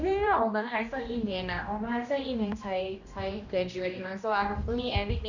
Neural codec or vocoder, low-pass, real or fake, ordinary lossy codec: codec, 16 kHz, 1 kbps, X-Codec, HuBERT features, trained on general audio; 7.2 kHz; fake; none